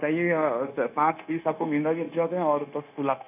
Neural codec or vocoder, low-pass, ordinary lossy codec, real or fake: codec, 16 kHz, 0.9 kbps, LongCat-Audio-Codec; 3.6 kHz; none; fake